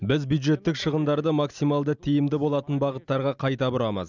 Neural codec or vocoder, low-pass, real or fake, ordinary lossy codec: none; 7.2 kHz; real; none